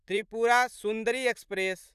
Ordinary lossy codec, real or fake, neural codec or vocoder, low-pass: none; fake; vocoder, 44.1 kHz, 128 mel bands, Pupu-Vocoder; 14.4 kHz